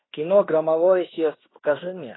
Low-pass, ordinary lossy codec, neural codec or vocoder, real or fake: 7.2 kHz; AAC, 16 kbps; codec, 16 kHz in and 24 kHz out, 0.9 kbps, LongCat-Audio-Codec, fine tuned four codebook decoder; fake